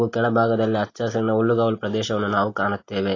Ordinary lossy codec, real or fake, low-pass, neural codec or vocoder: AAC, 32 kbps; real; 7.2 kHz; none